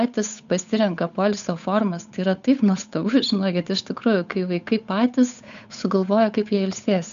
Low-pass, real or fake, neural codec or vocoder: 7.2 kHz; fake; codec, 16 kHz, 8 kbps, FunCodec, trained on Chinese and English, 25 frames a second